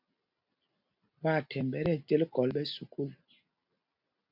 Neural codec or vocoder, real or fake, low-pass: none; real; 5.4 kHz